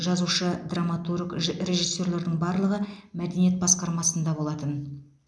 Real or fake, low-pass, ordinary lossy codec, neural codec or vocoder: real; none; none; none